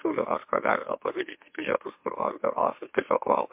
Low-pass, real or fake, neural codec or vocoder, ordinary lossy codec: 3.6 kHz; fake; autoencoder, 44.1 kHz, a latent of 192 numbers a frame, MeloTTS; MP3, 32 kbps